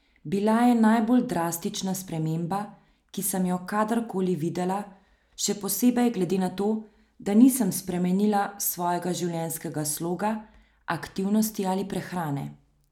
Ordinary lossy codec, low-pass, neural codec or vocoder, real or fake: none; 19.8 kHz; none; real